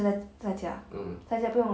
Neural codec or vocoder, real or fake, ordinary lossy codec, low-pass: none; real; none; none